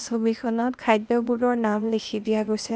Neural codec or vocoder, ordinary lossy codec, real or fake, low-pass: codec, 16 kHz, 0.8 kbps, ZipCodec; none; fake; none